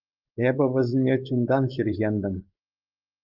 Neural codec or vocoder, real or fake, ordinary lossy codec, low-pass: codec, 16 kHz, 4.8 kbps, FACodec; fake; Opus, 32 kbps; 5.4 kHz